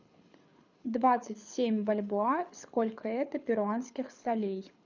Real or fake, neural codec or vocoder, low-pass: fake; codec, 24 kHz, 6 kbps, HILCodec; 7.2 kHz